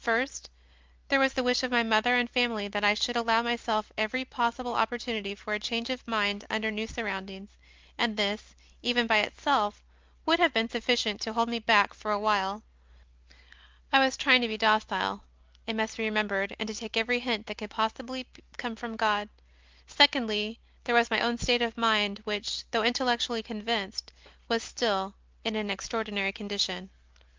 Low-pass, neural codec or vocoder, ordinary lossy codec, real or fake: 7.2 kHz; none; Opus, 32 kbps; real